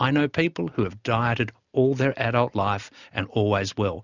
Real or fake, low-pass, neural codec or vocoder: real; 7.2 kHz; none